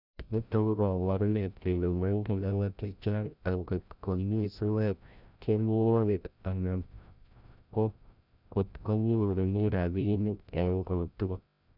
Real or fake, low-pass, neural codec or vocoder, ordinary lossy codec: fake; 5.4 kHz; codec, 16 kHz, 0.5 kbps, FreqCodec, larger model; none